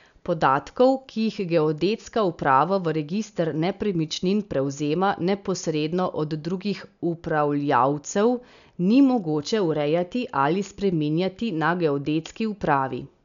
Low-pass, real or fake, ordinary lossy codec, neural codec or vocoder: 7.2 kHz; real; none; none